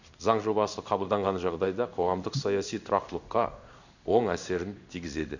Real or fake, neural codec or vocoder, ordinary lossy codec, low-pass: fake; codec, 16 kHz in and 24 kHz out, 1 kbps, XY-Tokenizer; none; 7.2 kHz